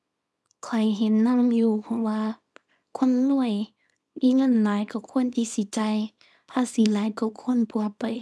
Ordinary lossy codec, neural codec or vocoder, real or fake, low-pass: none; codec, 24 kHz, 0.9 kbps, WavTokenizer, small release; fake; none